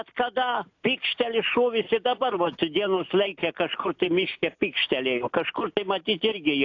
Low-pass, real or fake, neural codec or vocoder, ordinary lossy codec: 7.2 kHz; real; none; AAC, 48 kbps